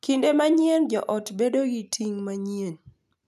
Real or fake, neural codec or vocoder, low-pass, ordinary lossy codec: real; none; 14.4 kHz; none